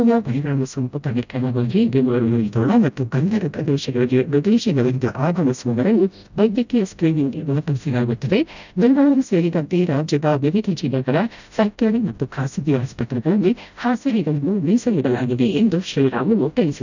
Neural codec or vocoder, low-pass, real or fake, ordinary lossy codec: codec, 16 kHz, 0.5 kbps, FreqCodec, smaller model; 7.2 kHz; fake; none